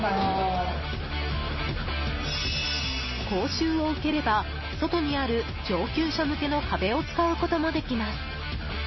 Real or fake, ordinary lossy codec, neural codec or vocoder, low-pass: real; MP3, 24 kbps; none; 7.2 kHz